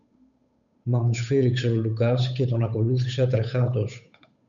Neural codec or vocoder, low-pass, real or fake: codec, 16 kHz, 8 kbps, FunCodec, trained on Chinese and English, 25 frames a second; 7.2 kHz; fake